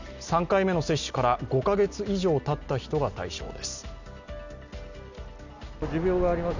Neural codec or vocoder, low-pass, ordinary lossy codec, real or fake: none; 7.2 kHz; none; real